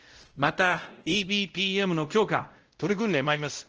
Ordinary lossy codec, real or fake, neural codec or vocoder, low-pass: Opus, 16 kbps; fake; codec, 16 kHz, 0.5 kbps, X-Codec, WavLM features, trained on Multilingual LibriSpeech; 7.2 kHz